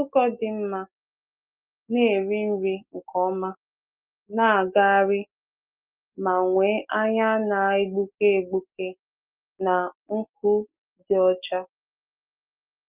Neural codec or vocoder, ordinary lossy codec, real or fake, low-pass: none; Opus, 24 kbps; real; 3.6 kHz